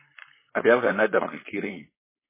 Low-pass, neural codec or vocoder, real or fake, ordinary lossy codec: 3.6 kHz; codec, 16 kHz, 4.8 kbps, FACodec; fake; MP3, 16 kbps